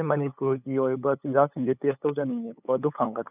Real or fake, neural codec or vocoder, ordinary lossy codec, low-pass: fake; codec, 16 kHz, 8 kbps, FunCodec, trained on LibriTTS, 25 frames a second; none; 3.6 kHz